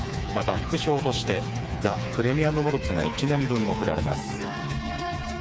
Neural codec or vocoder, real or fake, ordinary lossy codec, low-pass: codec, 16 kHz, 4 kbps, FreqCodec, smaller model; fake; none; none